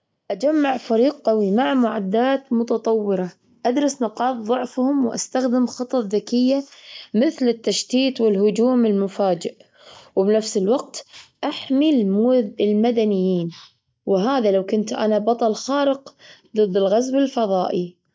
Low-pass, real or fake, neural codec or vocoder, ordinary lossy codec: none; real; none; none